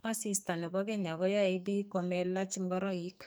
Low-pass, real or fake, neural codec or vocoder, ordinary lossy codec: none; fake; codec, 44.1 kHz, 2.6 kbps, SNAC; none